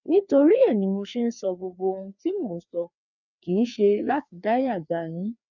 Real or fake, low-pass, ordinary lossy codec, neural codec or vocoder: fake; 7.2 kHz; none; codec, 16 kHz, 2 kbps, FreqCodec, larger model